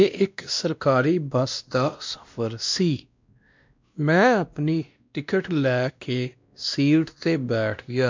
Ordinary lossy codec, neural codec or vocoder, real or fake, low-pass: MP3, 64 kbps; codec, 16 kHz, 1 kbps, X-Codec, WavLM features, trained on Multilingual LibriSpeech; fake; 7.2 kHz